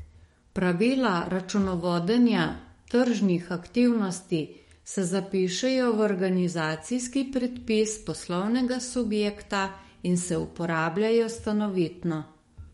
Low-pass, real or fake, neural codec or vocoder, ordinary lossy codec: 19.8 kHz; fake; codec, 44.1 kHz, 7.8 kbps, DAC; MP3, 48 kbps